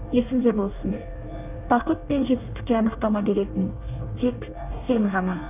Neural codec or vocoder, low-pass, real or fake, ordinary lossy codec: codec, 24 kHz, 1 kbps, SNAC; 3.6 kHz; fake; none